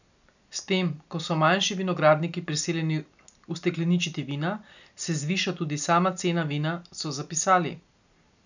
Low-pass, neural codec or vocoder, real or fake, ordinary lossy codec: 7.2 kHz; none; real; none